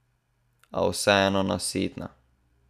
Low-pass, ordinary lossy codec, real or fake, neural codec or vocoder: 14.4 kHz; none; real; none